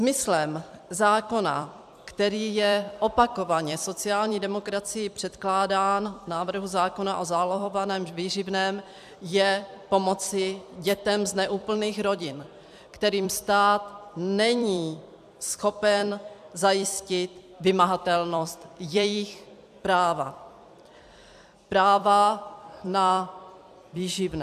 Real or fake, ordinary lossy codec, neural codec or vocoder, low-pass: fake; AAC, 96 kbps; vocoder, 44.1 kHz, 128 mel bands every 256 samples, BigVGAN v2; 14.4 kHz